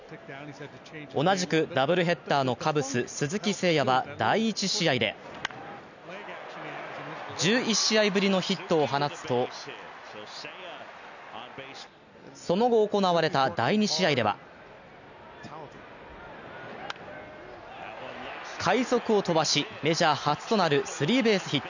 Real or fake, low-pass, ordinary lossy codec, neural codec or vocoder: real; 7.2 kHz; none; none